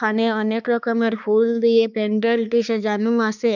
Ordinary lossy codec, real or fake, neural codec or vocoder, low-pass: none; fake; codec, 16 kHz, 2 kbps, X-Codec, HuBERT features, trained on balanced general audio; 7.2 kHz